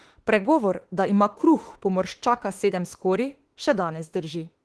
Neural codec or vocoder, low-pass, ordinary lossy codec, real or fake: autoencoder, 48 kHz, 32 numbers a frame, DAC-VAE, trained on Japanese speech; 10.8 kHz; Opus, 16 kbps; fake